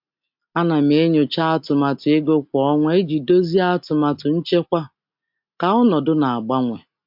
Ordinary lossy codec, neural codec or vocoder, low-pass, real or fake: MP3, 48 kbps; none; 5.4 kHz; real